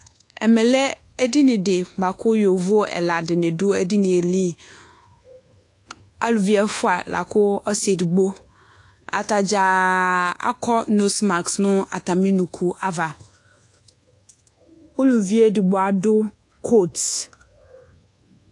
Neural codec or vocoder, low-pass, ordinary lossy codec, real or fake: codec, 24 kHz, 1.2 kbps, DualCodec; 10.8 kHz; AAC, 48 kbps; fake